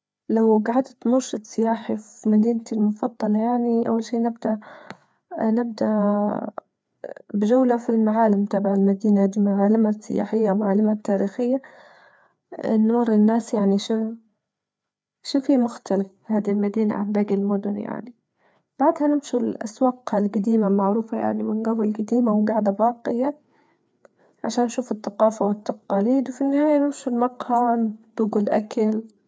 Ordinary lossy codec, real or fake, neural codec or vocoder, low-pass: none; fake; codec, 16 kHz, 4 kbps, FreqCodec, larger model; none